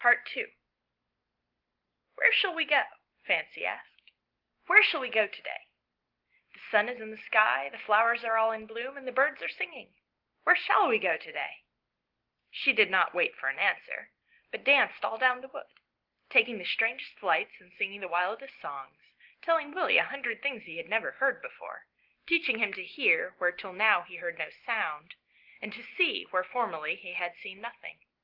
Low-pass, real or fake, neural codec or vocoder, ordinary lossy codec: 5.4 kHz; real; none; Opus, 16 kbps